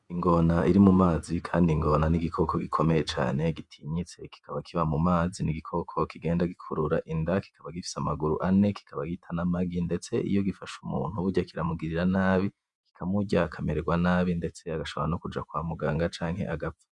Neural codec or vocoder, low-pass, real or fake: vocoder, 48 kHz, 128 mel bands, Vocos; 10.8 kHz; fake